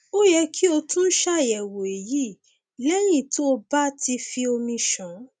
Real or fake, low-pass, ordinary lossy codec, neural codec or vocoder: real; none; none; none